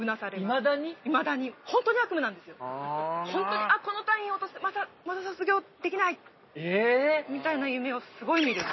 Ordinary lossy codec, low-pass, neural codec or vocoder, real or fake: MP3, 24 kbps; 7.2 kHz; none; real